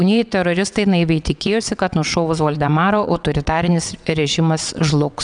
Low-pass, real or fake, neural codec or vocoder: 9.9 kHz; fake; vocoder, 22.05 kHz, 80 mel bands, WaveNeXt